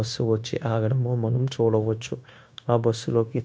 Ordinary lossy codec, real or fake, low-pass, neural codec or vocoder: none; fake; none; codec, 16 kHz, 0.9 kbps, LongCat-Audio-Codec